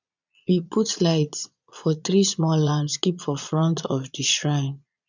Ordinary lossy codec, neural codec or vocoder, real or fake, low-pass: none; vocoder, 22.05 kHz, 80 mel bands, Vocos; fake; 7.2 kHz